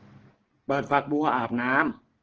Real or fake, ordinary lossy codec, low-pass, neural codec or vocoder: real; Opus, 16 kbps; 7.2 kHz; none